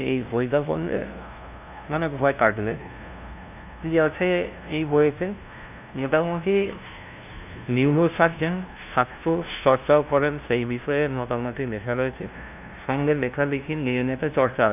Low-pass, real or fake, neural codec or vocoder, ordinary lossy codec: 3.6 kHz; fake; codec, 16 kHz, 0.5 kbps, FunCodec, trained on LibriTTS, 25 frames a second; none